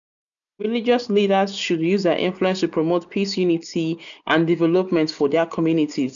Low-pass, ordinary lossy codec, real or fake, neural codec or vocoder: 7.2 kHz; MP3, 96 kbps; real; none